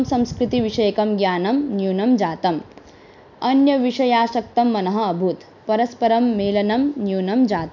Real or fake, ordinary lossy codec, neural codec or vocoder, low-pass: real; none; none; 7.2 kHz